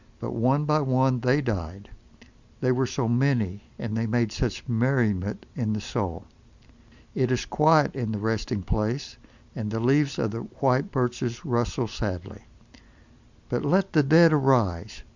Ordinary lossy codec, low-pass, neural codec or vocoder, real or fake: Opus, 64 kbps; 7.2 kHz; none; real